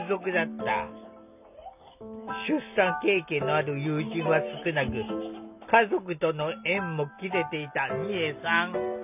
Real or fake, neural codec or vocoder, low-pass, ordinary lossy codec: real; none; 3.6 kHz; none